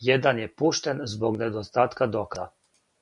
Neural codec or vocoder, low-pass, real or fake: none; 10.8 kHz; real